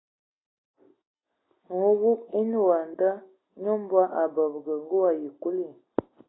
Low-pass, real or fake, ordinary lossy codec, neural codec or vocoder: 7.2 kHz; real; AAC, 16 kbps; none